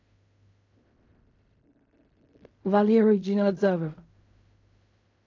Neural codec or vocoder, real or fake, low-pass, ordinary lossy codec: codec, 16 kHz in and 24 kHz out, 0.4 kbps, LongCat-Audio-Codec, fine tuned four codebook decoder; fake; 7.2 kHz; AAC, 48 kbps